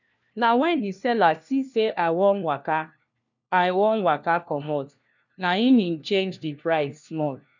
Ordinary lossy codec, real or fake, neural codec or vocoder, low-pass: none; fake; codec, 16 kHz, 1 kbps, FunCodec, trained on LibriTTS, 50 frames a second; 7.2 kHz